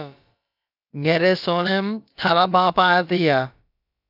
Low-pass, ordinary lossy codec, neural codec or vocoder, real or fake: 5.4 kHz; AAC, 48 kbps; codec, 16 kHz, about 1 kbps, DyCAST, with the encoder's durations; fake